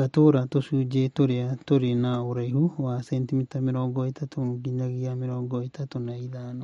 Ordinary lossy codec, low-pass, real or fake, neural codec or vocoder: MP3, 48 kbps; 19.8 kHz; real; none